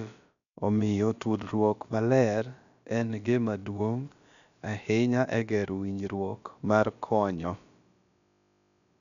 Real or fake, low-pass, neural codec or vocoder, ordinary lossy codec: fake; 7.2 kHz; codec, 16 kHz, about 1 kbps, DyCAST, with the encoder's durations; none